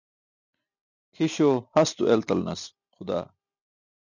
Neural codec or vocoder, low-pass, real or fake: none; 7.2 kHz; real